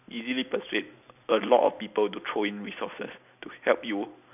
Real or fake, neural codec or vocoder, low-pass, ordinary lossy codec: real; none; 3.6 kHz; none